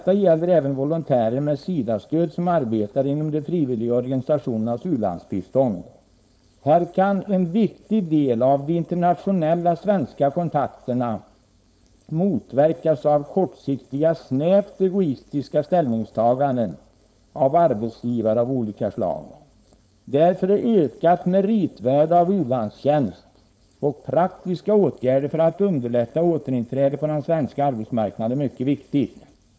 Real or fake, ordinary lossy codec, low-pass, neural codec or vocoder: fake; none; none; codec, 16 kHz, 4.8 kbps, FACodec